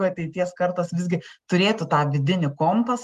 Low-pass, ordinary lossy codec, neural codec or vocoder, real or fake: 14.4 kHz; Opus, 32 kbps; none; real